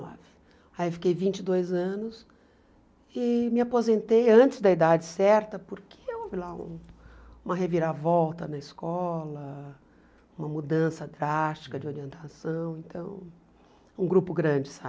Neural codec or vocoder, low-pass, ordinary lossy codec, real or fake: none; none; none; real